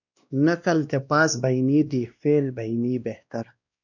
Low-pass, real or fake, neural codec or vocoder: 7.2 kHz; fake; codec, 16 kHz, 1 kbps, X-Codec, WavLM features, trained on Multilingual LibriSpeech